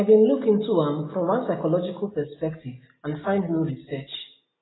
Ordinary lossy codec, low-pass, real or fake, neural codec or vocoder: AAC, 16 kbps; 7.2 kHz; real; none